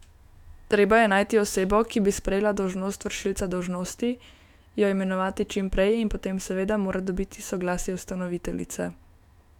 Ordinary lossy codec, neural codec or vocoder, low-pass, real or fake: none; autoencoder, 48 kHz, 128 numbers a frame, DAC-VAE, trained on Japanese speech; 19.8 kHz; fake